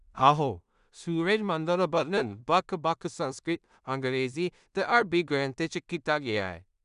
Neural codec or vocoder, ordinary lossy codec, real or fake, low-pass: codec, 16 kHz in and 24 kHz out, 0.4 kbps, LongCat-Audio-Codec, two codebook decoder; none; fake; 10.8 kHz